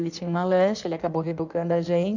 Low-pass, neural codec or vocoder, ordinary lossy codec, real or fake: 7.2 kHz; codec, 16 kHz in and 24 kHz out, 1.1 kbps, FireRedTTS-2 codec; none; fake